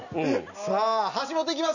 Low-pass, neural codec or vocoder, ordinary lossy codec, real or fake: 7.2 kHz; none; none; real